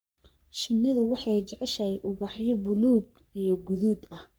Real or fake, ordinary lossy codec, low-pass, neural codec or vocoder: fake; none; none; codec, 44.1 kHz, 3.4 kbps, Pupu-Codec